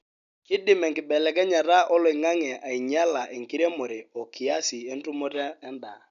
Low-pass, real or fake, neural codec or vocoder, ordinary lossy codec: 7.2 kHz; real; none; none